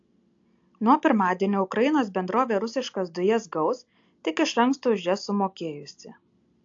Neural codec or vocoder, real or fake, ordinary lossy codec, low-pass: none; real; AAC, 48 kbps; 7.2 kHz